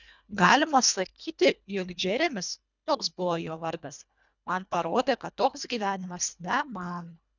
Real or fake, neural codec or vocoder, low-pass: fake; codec, 24 kHz, 1.5 kbps, HILCodec; 7.2 kHz